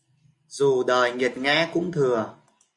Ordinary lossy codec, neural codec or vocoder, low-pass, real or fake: MP3, 64 kbps; none; 10.8 kHz; real